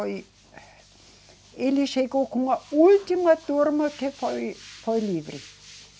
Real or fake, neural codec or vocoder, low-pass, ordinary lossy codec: real; none; none; none